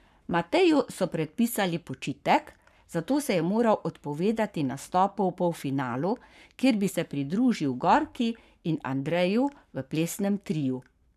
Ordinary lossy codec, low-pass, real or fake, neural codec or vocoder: none; 14.4 kHz; fake; codec, 44.1 kHz, 7.8 kbps, Pupu-Codec